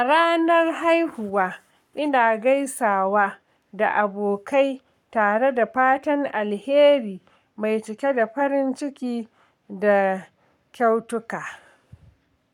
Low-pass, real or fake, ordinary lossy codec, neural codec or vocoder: 19.8 kHz; fake; none; codec, 44.1 kHz, 7.8 kbps, Pupu-Codec